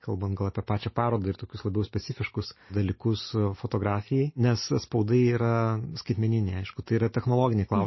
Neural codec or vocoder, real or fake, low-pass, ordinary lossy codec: none; real; 7.2 kHz; MP3, 24 kbps